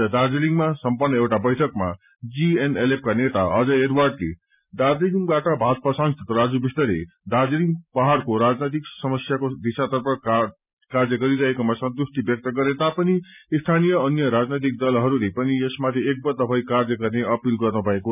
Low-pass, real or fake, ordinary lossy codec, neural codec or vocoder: 3.6 kHz; real; none; none